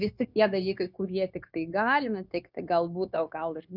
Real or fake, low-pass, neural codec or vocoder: fake; 5.4 kHz; codec, 16 kHz, 0.9 kbps, LongCat-Audio-Codec